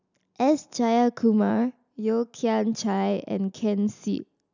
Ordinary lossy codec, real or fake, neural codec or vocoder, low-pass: none; real; none; 7.2 kHz